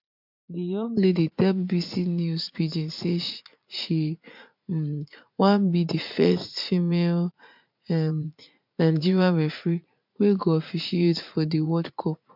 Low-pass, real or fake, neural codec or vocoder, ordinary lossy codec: 5.4 kHz; fake; vocoder, 44.1 kHz, 80 mel bands, Vocos; MP3, 48 kbps